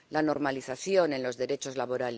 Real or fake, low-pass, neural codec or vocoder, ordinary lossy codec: real; none; none; none